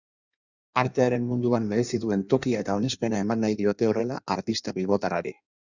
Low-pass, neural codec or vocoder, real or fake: 7.2 kHz; codec, 16 kHz in and 24 kHz out, 1.1 kbps, FireRedTTS-2 codec; fake